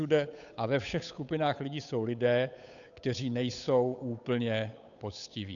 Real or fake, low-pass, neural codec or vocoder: fake; 7.2 kHz; codec, 16 kHz, 8 kbps, FunCodec, trained on Chinese and English, 25 frames a second